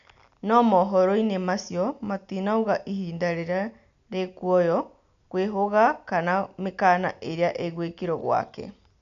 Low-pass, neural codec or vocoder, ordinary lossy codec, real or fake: 7.2 kHz; none; none; real